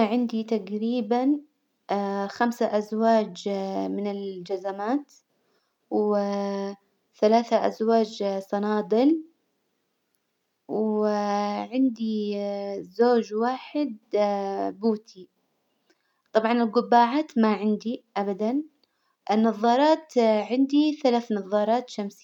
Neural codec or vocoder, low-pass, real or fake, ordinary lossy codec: none; 19.8 kHz; real; none